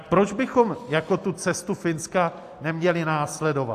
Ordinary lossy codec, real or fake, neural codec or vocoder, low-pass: AAC, 64 kbps; real; none; 14.4 kHz